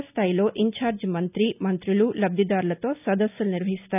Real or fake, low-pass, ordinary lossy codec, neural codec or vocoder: real; 3.6 kHz; none; none